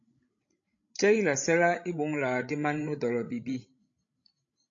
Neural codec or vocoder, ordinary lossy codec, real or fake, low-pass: codec, 16 kHz, 16 kbps, FreqCodec, larger model; AAC, 48 kbps; fake; 7.2 kHz